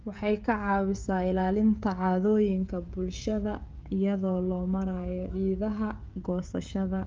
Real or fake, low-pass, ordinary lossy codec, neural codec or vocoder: fake; 7.2 kHz; Opus, 24 kbps; codec, 16 kHz, 16 kbps, FreqCodec, smaller model